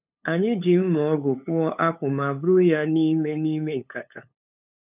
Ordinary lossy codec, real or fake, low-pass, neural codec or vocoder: none; fake; 3.6 kHz; codec, 16 kHz, 8 kbps, FunCodec, trained on LibriTTS, 25 frames a second